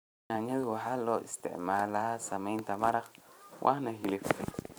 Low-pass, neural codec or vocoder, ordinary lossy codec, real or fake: none; vocoder, 44.1 kHz, 128 mel bands every 512 samples, BigVGAN v2; none; fake